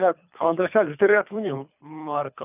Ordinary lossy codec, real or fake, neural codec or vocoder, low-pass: none; fake; codec, 24 kHz, 3 kbps, HILCodec; 3.6 kHz